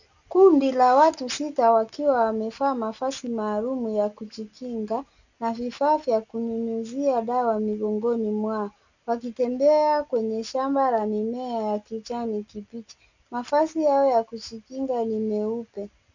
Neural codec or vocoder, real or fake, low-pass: none; real; 7.2 kHz